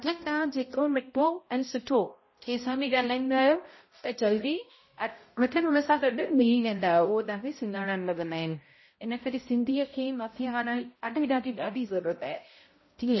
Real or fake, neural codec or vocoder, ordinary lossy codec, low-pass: fake; codec, 16 kHz, 0.5 kbps, X-Codec, HuBERT features, trained on balanced general audio; MP3, 24 kbps; 7.2 kHz